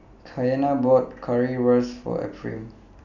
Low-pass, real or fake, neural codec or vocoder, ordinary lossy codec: 7.2 kHz; real; none; none